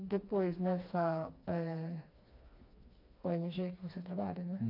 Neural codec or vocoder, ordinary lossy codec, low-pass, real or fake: codec, 16 kHz, 2 kbps, FreqCodec, smaller model; AAC, 32 kbps; 5.4 kHz; fake